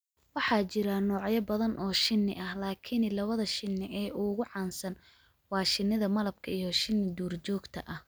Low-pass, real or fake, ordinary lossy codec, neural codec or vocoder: none; real; none; none